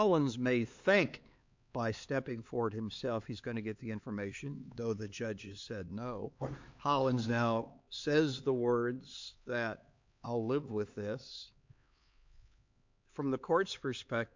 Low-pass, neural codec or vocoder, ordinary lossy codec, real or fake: 7.2 kHz; codec, 16 kHz, 4 kbps, X-Codec, HuBERT features, trained on LibriSpeech; MP3, 64 kbps; fake